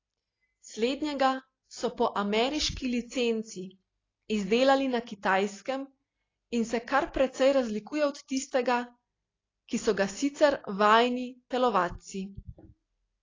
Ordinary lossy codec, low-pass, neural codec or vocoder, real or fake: AAC, 32 kbps; 7.2 kHz; none; real